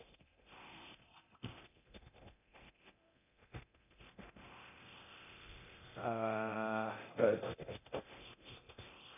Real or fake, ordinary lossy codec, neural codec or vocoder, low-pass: fake; none; codec, 24 kHz, 0.9 kbps, DualCodec; 3.6 kHz